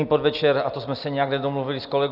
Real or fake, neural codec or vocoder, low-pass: real; none; 5.4 kHz